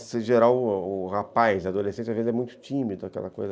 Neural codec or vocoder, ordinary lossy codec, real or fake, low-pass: none; none; real; none